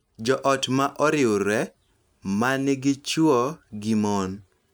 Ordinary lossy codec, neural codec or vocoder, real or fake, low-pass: none; none; real; none